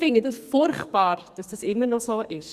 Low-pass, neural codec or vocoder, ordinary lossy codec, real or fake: 14.4 kHz; codec, 44.1 kHz, 2.6 kbps, SNAC; Opus, 64 kbps; fake